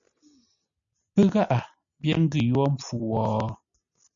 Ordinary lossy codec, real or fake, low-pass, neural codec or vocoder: MP3, 96 kbps; real; 7.2 kHz; none